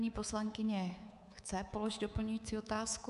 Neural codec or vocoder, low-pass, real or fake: codec, 24 kHz, 3.1 kbps, DualCodec; 10.8 kHz; fake